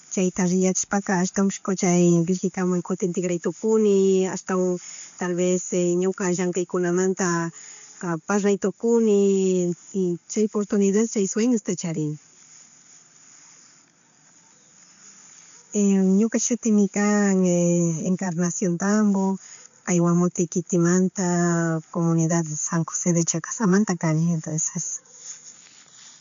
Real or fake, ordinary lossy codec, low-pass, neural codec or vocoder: real; MP3, 64 kbps; 7.2 kHz; none